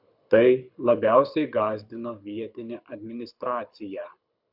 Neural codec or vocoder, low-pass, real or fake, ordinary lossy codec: codec, 24 kHz, 6 kbps, HILCodec; 5.4 kHz; fake; Opus, 64 kbps